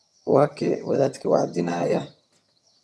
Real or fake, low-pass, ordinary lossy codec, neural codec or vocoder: fake; none; none; vocoder, 22.05 kHz, 80 mel bands, HiFi-GAN